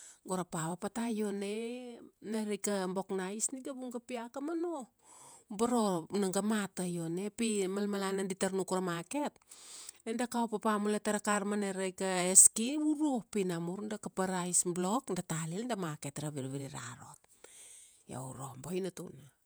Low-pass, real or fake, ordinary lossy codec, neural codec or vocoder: none; fake; none; vocoder, 48 kHz, 128 mel bands, Vocos